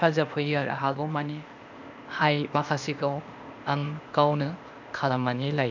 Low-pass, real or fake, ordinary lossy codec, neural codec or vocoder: 7.2 kHz; fake; none; codec, 16 kHz, 0.8 kbps, ZipCodec